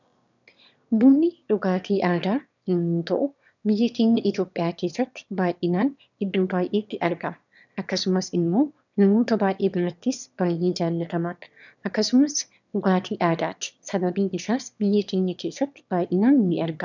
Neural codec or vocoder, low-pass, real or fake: autoencoder, 22.05 kHz, a latent of 192 numbers a frame, VITS, trained on one speaker; 7.2 kHz; fake